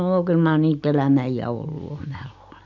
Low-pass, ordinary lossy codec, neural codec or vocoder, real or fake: 7.2 kHz; none; none; real